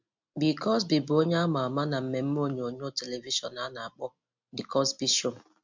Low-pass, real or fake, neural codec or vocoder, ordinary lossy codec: 7.2 kHz; real; none; MP3, 64 kbps